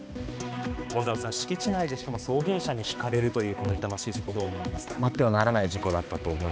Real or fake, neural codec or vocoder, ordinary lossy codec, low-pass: fake; codec, 16 kHz, 2 kbps, X-Codec, HuBERT features, trained on balanced general audio; none; none